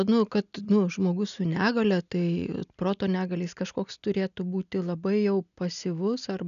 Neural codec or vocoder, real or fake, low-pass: none; real; 7.2 kHz